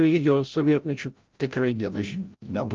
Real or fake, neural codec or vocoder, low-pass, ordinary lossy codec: fake; codec, 16 kHz, 0.5 kbps, FreqCodec, larger model; 7.2 kHz; Opus, 32 kbps